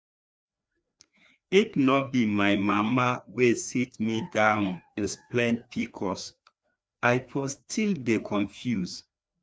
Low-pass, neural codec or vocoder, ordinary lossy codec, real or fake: none; codec, 16 kHz, 2 kbps, FreqCodec, larger model; none; fake